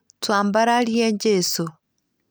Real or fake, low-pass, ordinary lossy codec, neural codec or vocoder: real; none; none; none